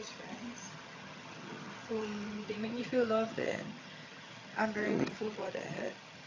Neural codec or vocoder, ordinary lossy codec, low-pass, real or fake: vocoder, 22.05 kHz, 80 mel bands, HiFi-GAN; AAC, 32 kbps; 7.2 kHz; fake